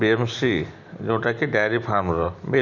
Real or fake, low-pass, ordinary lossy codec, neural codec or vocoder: real; 7.2 kHz; none; none